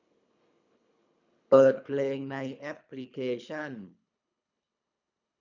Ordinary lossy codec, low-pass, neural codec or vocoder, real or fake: none; 7.2 kHz; codec, 24 kHz, 3 kbps, HILCodec; fake